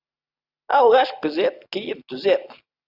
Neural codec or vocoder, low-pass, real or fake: none; 5.4 kHz; real